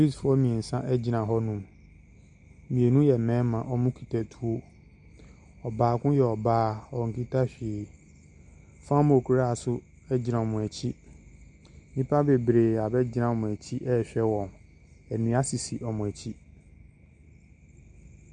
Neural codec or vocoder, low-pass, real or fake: none; 9.9 kHz; real